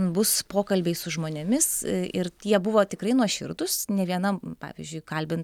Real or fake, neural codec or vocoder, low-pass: real; none; 19.8 kHz